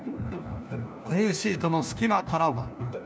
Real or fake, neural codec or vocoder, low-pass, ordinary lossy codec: fake; codec, 16 kHz, 1 kbps, FunCodec, trained on LibriTTS, 50 frames a second; none; none